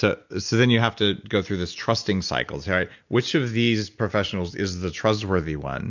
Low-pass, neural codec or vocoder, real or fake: 7.2 kHz; none; real